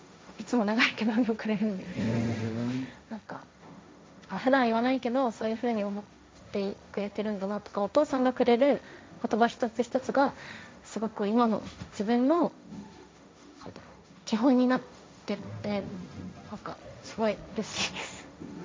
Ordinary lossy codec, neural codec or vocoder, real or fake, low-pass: none; codec, 16 kHz, 1.1 kbps, Voila-Tokenizer; fake; none